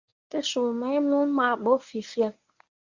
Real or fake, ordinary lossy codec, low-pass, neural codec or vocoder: fake; Opus, 64 kbps; 7.2 kHz; codec, 24 kHz, 0.9 kbps, WavTokenizer, medium speech release version 1